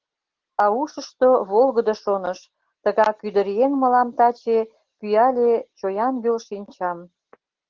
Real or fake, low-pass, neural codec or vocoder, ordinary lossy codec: real; 7.2 kHz; none; Opus, 16 kbps